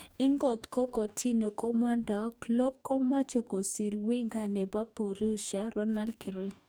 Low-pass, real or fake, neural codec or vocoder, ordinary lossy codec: none; fake; codec, 44.1 kHz, 2.6 kbps, DAC; none